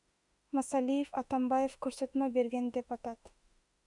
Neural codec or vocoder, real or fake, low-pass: autoencoder, 48 kHz, 32 numbers a frame, DAC-VAE, trained on Japanese speech; fake; 10.8 kHz